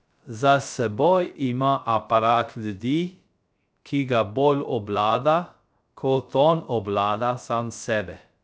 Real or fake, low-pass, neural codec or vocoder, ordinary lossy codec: fake; none; codec, 16 kHz, 0.3 kbps, FocalCodec; none